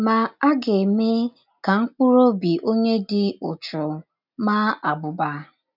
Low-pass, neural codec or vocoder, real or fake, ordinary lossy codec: 5.4 kHz; none; real; none